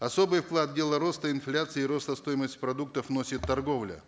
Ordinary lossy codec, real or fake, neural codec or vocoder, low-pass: none; real; none; none